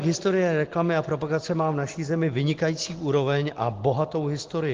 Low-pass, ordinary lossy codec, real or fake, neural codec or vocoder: 7.2 kHz; Opus, 16 kbps; real; none